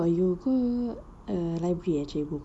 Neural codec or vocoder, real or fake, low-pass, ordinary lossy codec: none; real; none; none